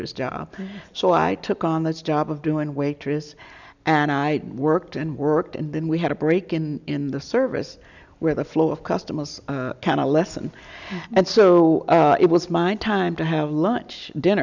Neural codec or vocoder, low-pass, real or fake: none; 7.2 kHz; real